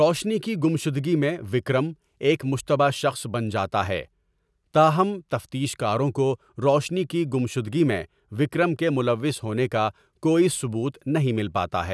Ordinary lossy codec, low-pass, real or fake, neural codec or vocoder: none; none; real; none